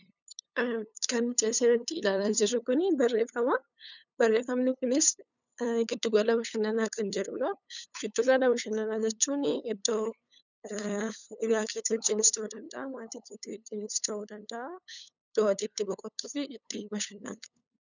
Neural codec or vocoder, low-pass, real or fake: codec, 16 kHz, 8 kbps, FunCodec, trained on LibriTTS, 25 frames a second; 7.2 kHz; fake